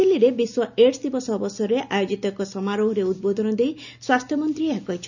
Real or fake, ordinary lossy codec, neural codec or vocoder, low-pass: real; none; none; 7.2 kHz